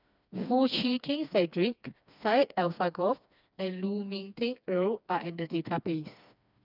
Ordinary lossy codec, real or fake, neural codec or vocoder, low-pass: none; fake; codec, 16 kHz, 2 kbps, FreqCodec, smaller model; 5.4 kHz